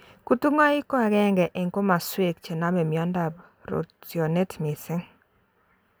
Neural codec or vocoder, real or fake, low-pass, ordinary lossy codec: none; real; none; none